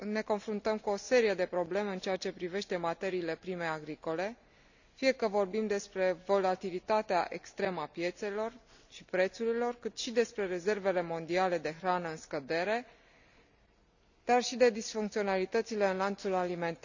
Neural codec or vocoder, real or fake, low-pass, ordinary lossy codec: none; real; 7.2 kHz; none